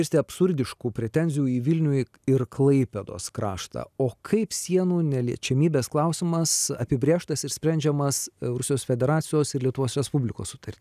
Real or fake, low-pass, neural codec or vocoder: real; 14.4 kHz; none